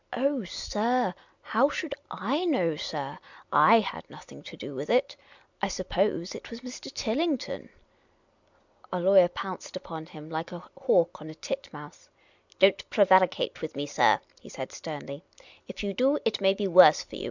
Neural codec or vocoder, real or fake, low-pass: none; real; 7.2 kHz